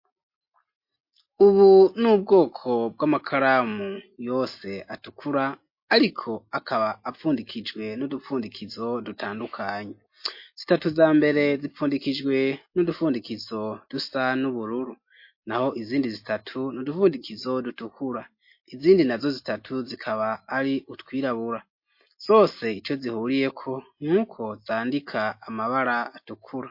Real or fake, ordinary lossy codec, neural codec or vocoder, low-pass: real; MP3, 32 kbps; none; 5.4 kHz